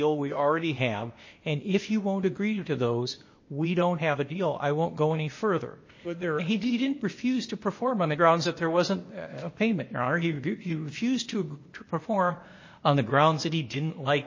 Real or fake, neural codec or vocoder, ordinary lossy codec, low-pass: fake; codec, 16 kHz, 0.8 kbps, ZipCodec; MP3, 32 kbps; 7.2 kHz